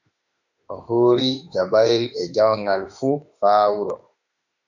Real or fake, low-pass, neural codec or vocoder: fake; 7.2 kHz; autoencoder, 48 kHz, 32 numbers a frame, DAC-VAE, trained on Japanese speech